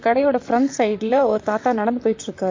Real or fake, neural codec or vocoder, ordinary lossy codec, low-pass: fake; vocoder, 44.1 kHz, 128 mel bands, Pupu-Vocoder; MP3, 48 kbps; 7.2 kHz